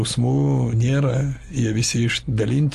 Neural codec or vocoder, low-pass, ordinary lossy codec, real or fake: none; 14.4 kHz; Opus, 24 kbps; real